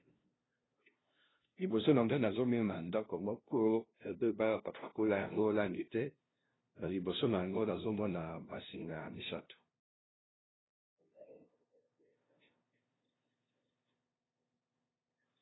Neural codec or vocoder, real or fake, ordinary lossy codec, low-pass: codec, 16 kHz, 0.5 kbps, FunCodec, trained on LibriTTS, 25 frames a second; fake; AAC, 16 kbps; 7.2 kHz